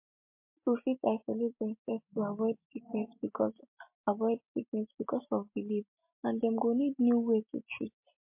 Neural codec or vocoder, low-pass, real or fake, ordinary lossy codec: none; 3.6 kHz; real; MP3, 32 kbps